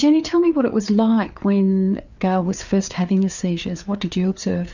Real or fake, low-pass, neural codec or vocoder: fake; 7.2 kHz; codec, 16 kHz, 4 kbps, FreqCodec, larger model